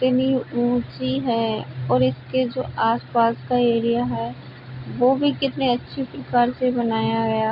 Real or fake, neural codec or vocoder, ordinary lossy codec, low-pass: real; none; none; 5.4 kHz